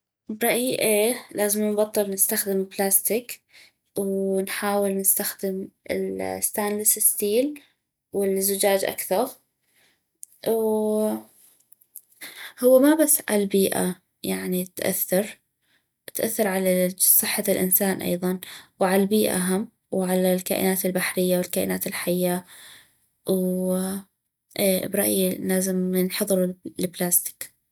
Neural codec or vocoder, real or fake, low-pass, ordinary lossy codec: none; real; none; none